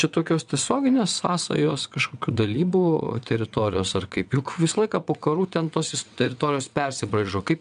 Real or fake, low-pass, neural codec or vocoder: fake; 9.9 kHz; vocoder, 22.05 kHz, 80 mel bands, WaveNeXt